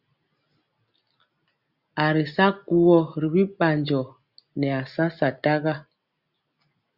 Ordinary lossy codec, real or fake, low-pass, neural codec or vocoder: Opus, 64 kbps; real; 5.4 kHz; none